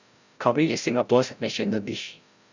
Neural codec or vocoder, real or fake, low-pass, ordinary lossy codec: codec, 16 kHz, 0.5 kbps, FreqCodec, larger model; fake; 7.2 kHz; Opus, 64 kbps